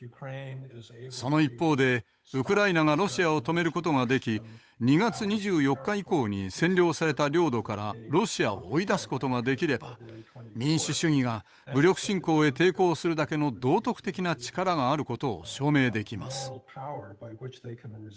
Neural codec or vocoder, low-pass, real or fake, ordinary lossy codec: codec, 16 kHz, 8 kbps, FunCodec, trained on Chinese and English, 25 frames a second; none; fake; none